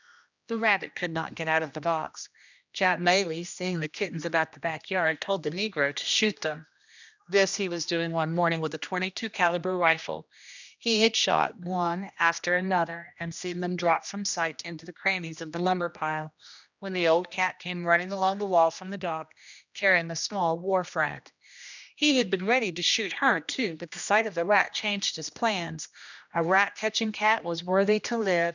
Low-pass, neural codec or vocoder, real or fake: 7.2 kHz; codec, 16 kHz, 1 kbps, X-Codec, HuBERT features, trained on general audio; fake